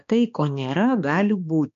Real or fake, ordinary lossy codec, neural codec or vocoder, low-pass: fake; MP3, 48 kbps; codec, 16 kHz, 2 kbps, X-Codec, HuBERT features, trained on balanced general audio; 7.2 kHz